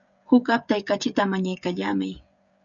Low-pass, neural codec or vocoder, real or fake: 7.2 kHz; codec, 16 kHz, 16 kbps, FreqCodec, smaller model; fake